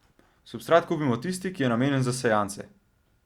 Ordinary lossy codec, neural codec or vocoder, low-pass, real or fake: Opus, 64 kbps; none; 19.8 kHz; real